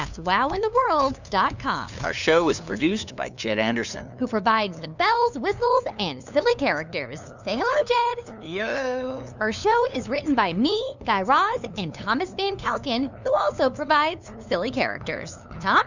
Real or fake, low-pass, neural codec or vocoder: fake; 7.2 kHz; codec, 16 kHz, 2 kbps, FunCodec, trained on LibriTTS, 25 frames a second